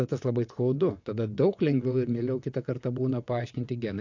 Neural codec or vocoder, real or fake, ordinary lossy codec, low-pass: vocoder, 22.05 kHz, 80 mel bands, WaveNeXt; fake; AAC, 48 kbps; 7.2 kHz